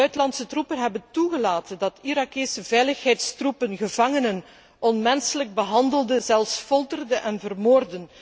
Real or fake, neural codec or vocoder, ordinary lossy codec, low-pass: real; none; none; none